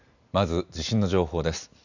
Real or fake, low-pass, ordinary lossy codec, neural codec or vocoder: real; 7.2 kHz; none; none